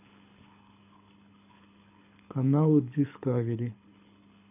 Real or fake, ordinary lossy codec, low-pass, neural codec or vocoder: fake; none; 3.6 kHz; codec, 24 kHz, 6 kbps, HILCodec